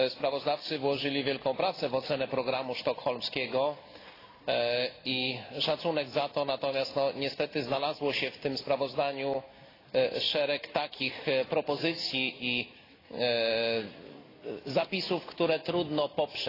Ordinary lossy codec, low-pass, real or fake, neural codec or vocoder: AAC, 24 kbps; 5.4 kHz; real; none